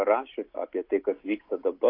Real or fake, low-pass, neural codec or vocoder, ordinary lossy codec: real; 5.4 kHz; none; AAC, 32 kbps